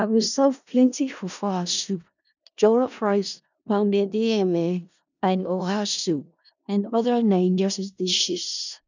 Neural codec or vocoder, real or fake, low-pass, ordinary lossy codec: codec, 16 kHz in and 24 kHz out, 0.4 kbps, LongCat-Audio-Codec, four codebook decoder; fake; 7.2 kHz; none